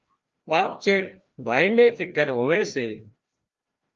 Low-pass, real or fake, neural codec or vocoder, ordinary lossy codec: 7.2 kHz; fake; codec, 16 kHz, 1 kbps, FreqCodec, larger model; Opus, 32 kbps